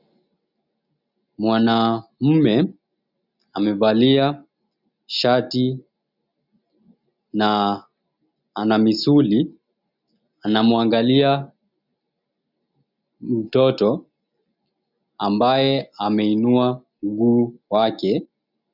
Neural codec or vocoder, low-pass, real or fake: none; 5.4 kHz; real